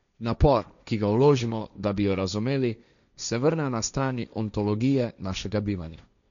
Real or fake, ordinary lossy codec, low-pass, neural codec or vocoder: fake; none; 7.2 kHz; codec, 16 kHz, 1.1 kbps, Voila-Tokenizer